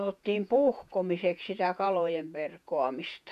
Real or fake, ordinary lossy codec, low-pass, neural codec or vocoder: fake; none; 14.4 kHz; vocoder, 48 kHz, 128 mel bands, Vocos